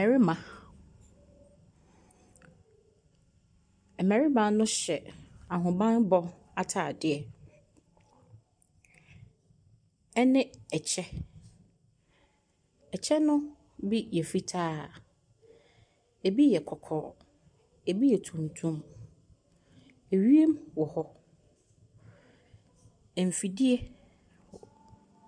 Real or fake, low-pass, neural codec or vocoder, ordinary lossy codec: real; 9.9 kHz; none; MP3, 96 kbps